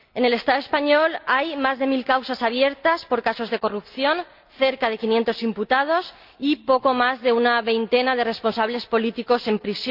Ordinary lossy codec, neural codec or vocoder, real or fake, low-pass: Opus, 24 kbps; none; real; 5.4 kHz